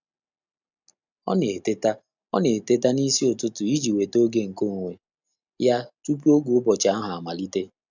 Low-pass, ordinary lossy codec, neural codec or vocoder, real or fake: 7.2 kHz; none; none; real